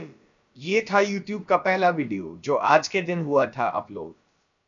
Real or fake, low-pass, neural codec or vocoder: fake; 7.2 kHz; codec, 16 kHz, about 1 kbps, DyCAST, with the encoder's durations